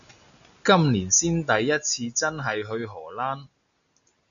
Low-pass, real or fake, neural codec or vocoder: 7.2 kHz; real; none